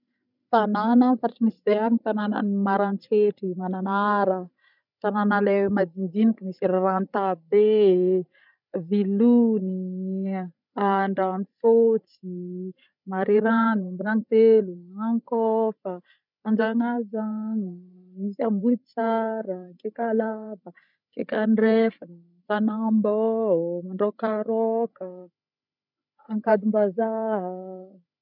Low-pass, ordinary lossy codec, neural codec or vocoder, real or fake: 5.4 kHz; none; codec, 16 kHz, 16 kbps, FreqCodec, larger model; fake